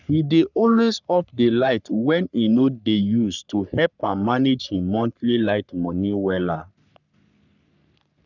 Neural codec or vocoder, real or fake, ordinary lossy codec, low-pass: codec, 44.1 kHz, 3.4 kbps, Pupu-Codec; fake; none; 7.2 kHz